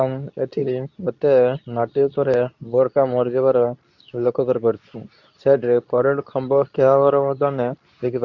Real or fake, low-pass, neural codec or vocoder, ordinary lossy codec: fake; 7.2 kHz; codec, 24 kHz, 0.9 kbps, WavTokenizer, medium speech release version 2; none